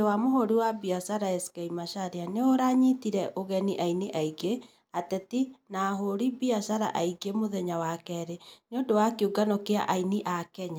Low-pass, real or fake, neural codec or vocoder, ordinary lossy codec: none; real; none; none